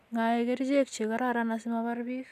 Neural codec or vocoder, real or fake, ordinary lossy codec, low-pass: none; real; none; 14.4 kHz